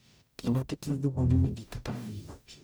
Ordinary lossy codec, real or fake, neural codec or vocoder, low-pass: none; fake; codec, 44.1 kHz, 0.9 kbps, DAC; none